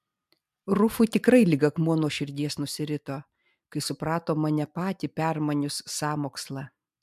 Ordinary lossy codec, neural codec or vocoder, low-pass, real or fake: MP3, 96 kbps; none; 14.4 kHz; real